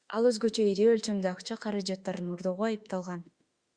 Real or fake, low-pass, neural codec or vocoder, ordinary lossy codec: fake; 9.9 kHz; autoencoder, 48 kHz, 32 numbers a frame, DAC-VAE, trained on Japanese speech; Opus, 64 kbps